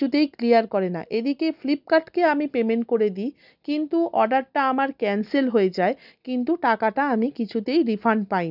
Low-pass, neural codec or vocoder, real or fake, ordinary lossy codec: 5.4 kHz; none; real; none